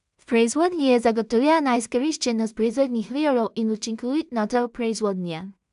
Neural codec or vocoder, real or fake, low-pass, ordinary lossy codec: codec, 16 kHz in and 24 kHz out, 0.4 kbps, LongCat-Audio-Codec, two codebook decoder; fake; 10.8 kHz; none